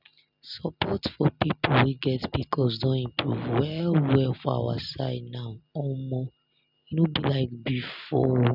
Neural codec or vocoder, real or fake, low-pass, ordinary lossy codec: none; real; 5.4 kHz; none